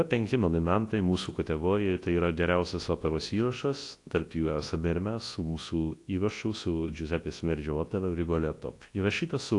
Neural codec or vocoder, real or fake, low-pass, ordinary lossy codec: codec, 24 kHz, 0.9 kbps, WavTokenizer, large speech release; fake; 10.8 kHz; AAC, 48 kbps